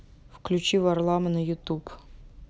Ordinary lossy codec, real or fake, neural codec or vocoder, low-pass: none; real; none; none